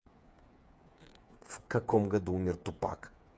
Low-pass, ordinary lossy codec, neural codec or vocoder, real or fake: none; none; codec, 16 kHz, 8 kbps, FreqCodec, smaller model; fake